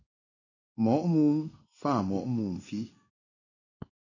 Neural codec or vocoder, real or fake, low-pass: codec, 16 kHz in and 24 kHz out, 1 kbps, XY-Tokenizer; fake; 7.2 kHz